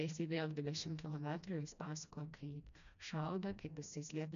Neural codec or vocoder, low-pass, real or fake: codec, 16 kHz, 1 kbps, FreqCodec, smaller model; 7.2 kHz; fake